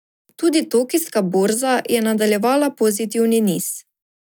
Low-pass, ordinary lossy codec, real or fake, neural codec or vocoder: none; none; real; none